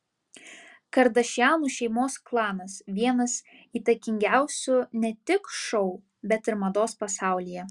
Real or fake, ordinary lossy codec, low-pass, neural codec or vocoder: real; Opus, 64 kbps; 10.8 kHz; none